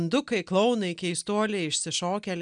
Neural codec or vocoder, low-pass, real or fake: none; 9.9 kHz; real